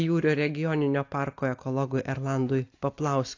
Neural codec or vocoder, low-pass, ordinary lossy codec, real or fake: none; 7.2 kHz; AAC, 48 kbps; real